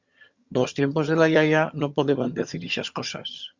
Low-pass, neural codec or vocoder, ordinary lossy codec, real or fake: 7.2 kHz; vocoder, 22.05 kHz, 80 mel bands, HiFi-GAN; Opus, 64 kbps; fake